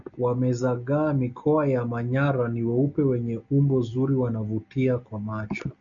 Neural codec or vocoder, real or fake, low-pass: none; real; 7.2 kHz